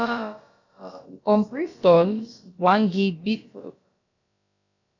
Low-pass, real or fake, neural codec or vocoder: 7.2 kHz; fake; codec, 16 kHz, about 1 kbps, DyCAST, with the encoder's durations